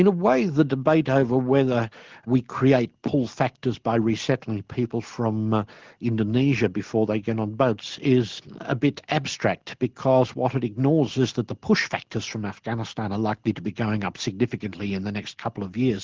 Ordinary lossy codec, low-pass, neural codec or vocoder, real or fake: Opus, 16 kbps; 7.2 kHz; none; real